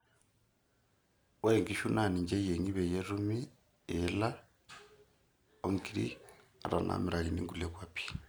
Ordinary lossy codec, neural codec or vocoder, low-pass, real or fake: none; none; none; real